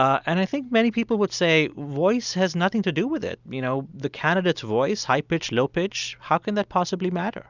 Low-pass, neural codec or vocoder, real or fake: 7.2 kHz; none; real